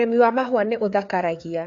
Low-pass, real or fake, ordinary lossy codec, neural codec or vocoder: 7.2 kHz; fake; AAC, 48 kbps; codec, 16 kHz, 4 kbps, FreqCodec, larger model